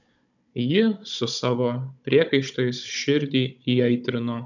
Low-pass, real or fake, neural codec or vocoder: 7.2 kHz; fake; codec, 16 kHz, 16 kbps, FunCodec, trained on Chinese and English, 50 frames a second